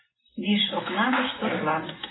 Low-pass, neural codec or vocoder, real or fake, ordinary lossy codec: 7.2 kHz; vocoder, 44.1 kHz, 128 mel bands every 256 samples, BigVGAN v2; fake; AAC, 16 kbps